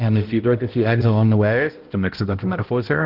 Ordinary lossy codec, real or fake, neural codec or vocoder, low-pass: Opus, 16 kbps; fake; codec, 16 kHz, 0.5 kbps, X-Codec, HuBERT features, trained on balanced general audio; 5.4 kHz